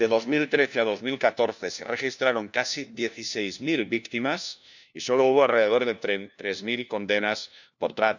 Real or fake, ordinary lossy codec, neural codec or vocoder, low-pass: fake; none; codec, 16 kHz, 1 kbps, FunCodec, trained on LibriTTS, 50 frames a second; 7.2 kHz